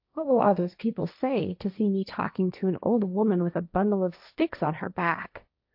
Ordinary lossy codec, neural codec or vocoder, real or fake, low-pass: AAC, 48 kbps; codec, 16 kHz, 1.1 kbps, Voila-Tokenizer; fake; 5.4 kHz